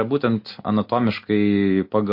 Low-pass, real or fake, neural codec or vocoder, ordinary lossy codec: 5.4 kHz; real; none; MP3, 32 kbps